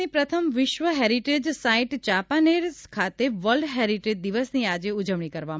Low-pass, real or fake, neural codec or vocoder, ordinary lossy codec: none; real; none; none